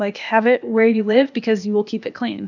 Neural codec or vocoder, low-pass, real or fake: codec, 16 kHz, 0.8 kbps, ZipCodec; 7.2 kHz; fake